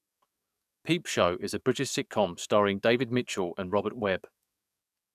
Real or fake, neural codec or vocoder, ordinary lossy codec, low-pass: fake; codec, 44.1 kHz, 7.8 kbps, DAC; none; 14.4 kHz